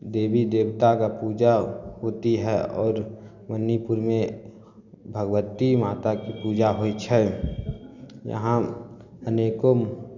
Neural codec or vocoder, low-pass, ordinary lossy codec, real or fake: none; 7.2 kHz; none; real